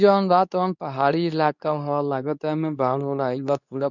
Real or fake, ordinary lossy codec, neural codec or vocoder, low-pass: fake; none; codec, 24 kHz, 0.9 kbps, WavTokenizer, medium speech release version 2; 7.2 kHz